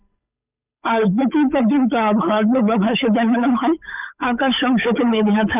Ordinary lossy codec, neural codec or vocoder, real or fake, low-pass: none; codec, 16 kHz, 8 kbps, FunCodec, trained on Chinese and English, 25 frames a second; fake; 3.6 kHz